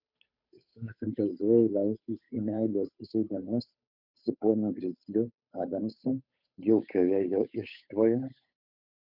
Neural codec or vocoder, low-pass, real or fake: codec, 16 kHz, 8 kbps, FunCodec, trained on Chinese and English, 25 frames a second; 5.4 kHz; fake